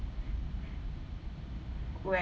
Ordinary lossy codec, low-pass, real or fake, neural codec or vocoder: none; none; real; none